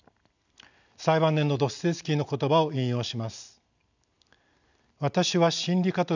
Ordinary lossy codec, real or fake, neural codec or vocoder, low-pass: none; real; none; 7.2 kHz